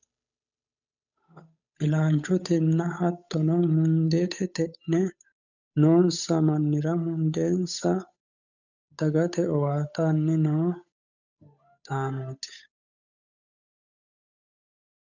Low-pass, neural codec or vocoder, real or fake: 7.2 kHz; codec, 16 kHz, 8 kbps, FunCodec, trained on Chinese and English, 25 frames a second; fake